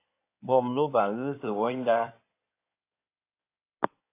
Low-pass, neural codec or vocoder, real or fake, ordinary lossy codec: 3.6 kHz; codec, 16 kHz in and 24 kHz out, 2.2 kbps, FireRedTTS-2 codec; fake; AAC, 24 kbps